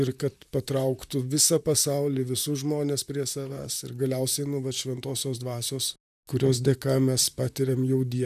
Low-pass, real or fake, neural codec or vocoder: 14.4 kHz; fake; vocoder, 44.1 kHz, 128 mel bands, Pupu-Vocoder